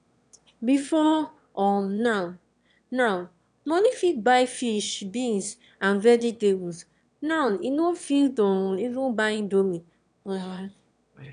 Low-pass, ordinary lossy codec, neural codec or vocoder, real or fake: 9.9 kHz; none; autoencoder, 22.05 kHz, a latent of 192 numbers a frame, VITS, trained on one speaker; fake